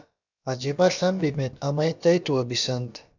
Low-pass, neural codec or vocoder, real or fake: 7.2 kHz; codec, 16 kHz, about 1 kbps, DyCAST, with the encoder's durations; fake